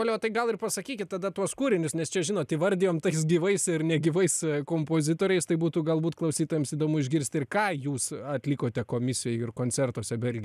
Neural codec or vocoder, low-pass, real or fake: none; 14.4 kHz; real